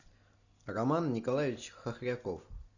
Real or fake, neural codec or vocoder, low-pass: real; none; 7.2 kHz